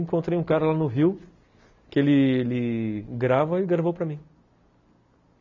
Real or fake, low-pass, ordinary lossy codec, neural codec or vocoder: real; 7.2 kHz; none; none